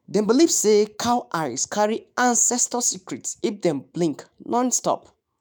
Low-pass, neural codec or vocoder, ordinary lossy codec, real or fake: none; autoencoder, 48 kHz, 128 numbers a frame, DAC-VAE, trained on Japanese speech; none; fake